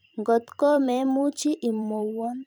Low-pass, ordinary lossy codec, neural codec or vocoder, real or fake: none; none; vocoder, 44.1 kHz, 128 mel bands every 256 samples, BigVGAN v2; fake